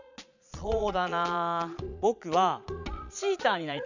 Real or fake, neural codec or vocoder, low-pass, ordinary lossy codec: fake; vocoder, 22.05 kHz, 80 mel bands, Vocos; 7.2 kHz; none